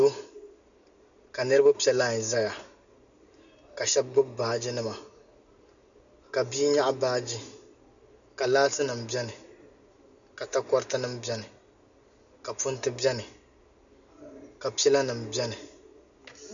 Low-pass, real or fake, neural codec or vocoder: 7.2 kHz; real; none